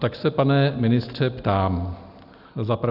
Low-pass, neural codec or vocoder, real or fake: 5.4 kHz; none; real